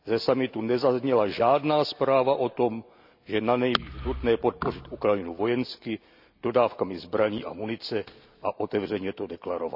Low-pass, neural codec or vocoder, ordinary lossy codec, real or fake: 5.4 kHz; none; none; real